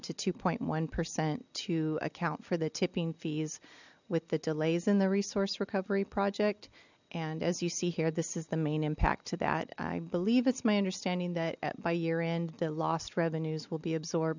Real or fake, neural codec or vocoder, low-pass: real; none; 7.2 kHz